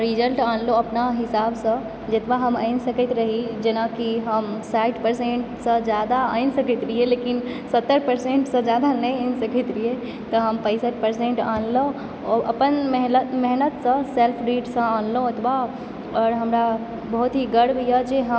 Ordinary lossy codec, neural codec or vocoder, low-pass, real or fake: none; none; none; real